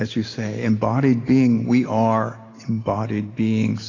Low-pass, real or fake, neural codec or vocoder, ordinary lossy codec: 7.2 kHz; real; none; AAC, 32 kbps